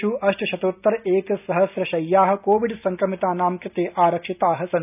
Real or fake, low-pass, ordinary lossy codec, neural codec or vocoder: real; 3.6 kHz; none; none